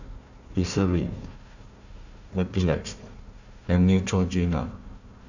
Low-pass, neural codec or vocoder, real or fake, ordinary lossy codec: 7.2 kHz; codec, 16 kHz, 1 kbps, FunCodec, trained on Chinese and English, 50 frames a second; fake; none